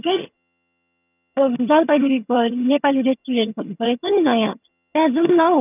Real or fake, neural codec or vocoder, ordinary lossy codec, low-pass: fake; vocoder, 22.05 kHz, 80 mel bands, HiFi-GAN; none; 3.6 kHz